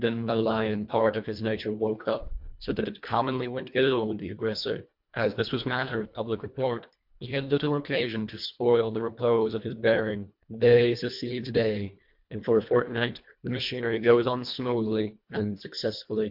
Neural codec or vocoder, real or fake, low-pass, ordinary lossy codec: codec, 24 kHz, 1.5 kbps, HILCodec; fake; 5.4 kHz; MP3, 48 kbps